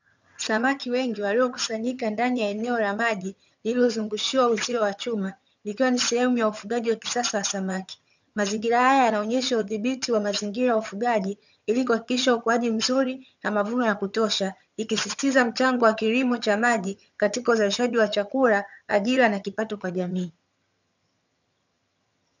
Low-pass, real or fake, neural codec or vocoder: 7.2 kHz; fake; vocoder, 22.05 kHz, 80 mel bands, HiFi-GAN